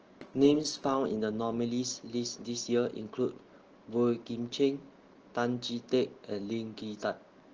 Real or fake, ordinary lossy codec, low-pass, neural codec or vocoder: real; Opus, 16 kbps; 7.2 kHz; none